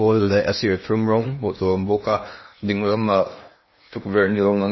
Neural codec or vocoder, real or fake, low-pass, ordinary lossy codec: codec, 16 kHz in and 24 kHz out, 0.8 kbps, FocalCodec, streaming, 65536 codes; fake; 7.2 kHz; MP3, 24 kbps